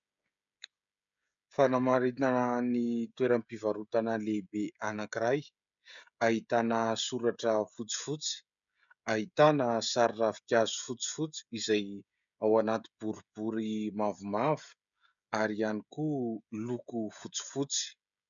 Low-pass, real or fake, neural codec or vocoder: 7.2 kHz; fake; codec, 16 kHz, 16 kbps, FreqCodec, smaller model